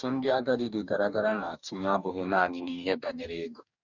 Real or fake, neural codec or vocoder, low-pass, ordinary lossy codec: fake; codec, 44.1 kHz, 2.6 kbps, DAC; 7.2 kHz; none